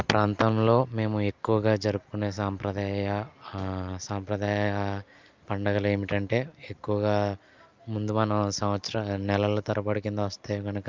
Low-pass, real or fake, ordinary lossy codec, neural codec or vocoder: 7.2 kHz; real; Opus, 24 kbps; none